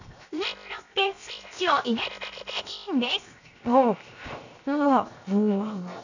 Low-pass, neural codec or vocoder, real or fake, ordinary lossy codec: 7.2 kHz; codec, 16 kHz, 0.7 kbps, FocalCodec; fake; none